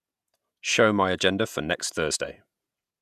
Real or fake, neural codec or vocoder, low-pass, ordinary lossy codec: fake; vocoder, 48 kHz, 128 mel bands, Vocos; 14.4 kHz; none